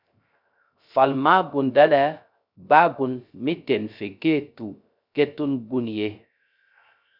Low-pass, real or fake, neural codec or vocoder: 5.4 kHz; fake; codec, 16 kHz, 0.3 kbps, FocalCodec